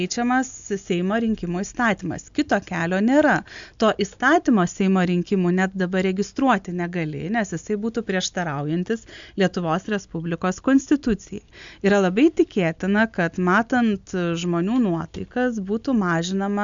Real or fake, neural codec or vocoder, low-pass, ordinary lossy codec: real; none; 7.2 kHz; MP3, 64 kbps